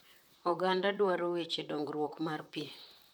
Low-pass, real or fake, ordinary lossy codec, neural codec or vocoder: none; fake; none; vocoder, 44.1 kHz, 128 mel bands, Pupu-Vocoder